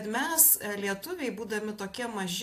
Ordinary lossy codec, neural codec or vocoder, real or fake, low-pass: AAC, 64 kbps; none; real; 14.4 kHz